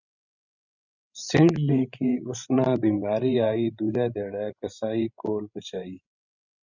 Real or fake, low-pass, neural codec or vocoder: fake; 7.2 kHz; codec, 16 kHz, 16 kbps, FreqCodec, larger model